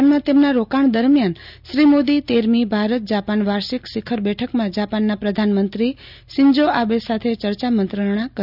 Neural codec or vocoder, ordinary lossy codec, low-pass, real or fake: none; none; 5.4 kHz; real